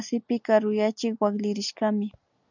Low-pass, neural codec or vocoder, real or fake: 7.2 kHz; none; real